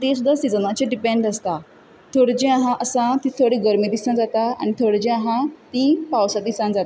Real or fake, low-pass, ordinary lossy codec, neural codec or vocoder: real; none; none; none